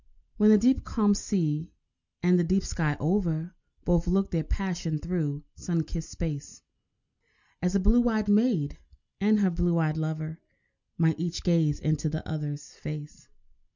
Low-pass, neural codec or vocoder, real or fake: 7.2 kHz; none; real